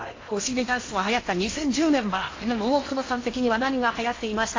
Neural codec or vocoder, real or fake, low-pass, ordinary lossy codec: codec, 16 kHz in and 24 kHz out, 0.8 kbps, FocalCodec, streaming, 65536 codes; fake; 7.2 kHz; AAC, 32 kbps